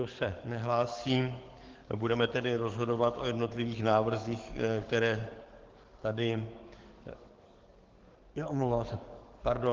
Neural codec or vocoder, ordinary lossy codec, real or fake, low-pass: codec, 44.1 kHz, 7.8 kbps, DAC; Opus, 16 kbps; fake; 7.2 kHz